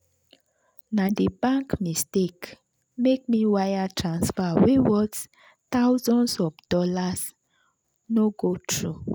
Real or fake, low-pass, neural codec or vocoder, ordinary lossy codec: real; none; none; none